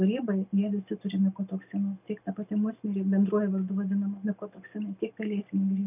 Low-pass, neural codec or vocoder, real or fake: 3.6 kHz; none; real